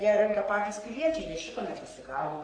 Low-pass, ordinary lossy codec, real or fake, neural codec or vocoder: 9.9 kHz; Opus, 64 kbps; fake; codec, 44.1 kHz, 3.4 kbps, Pupu-Codec